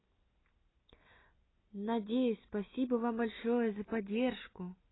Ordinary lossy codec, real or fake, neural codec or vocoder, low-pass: AAC, 16 kbps; real; none; 7.2 kHz